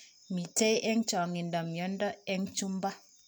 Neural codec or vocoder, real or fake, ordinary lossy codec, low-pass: none; real; none; none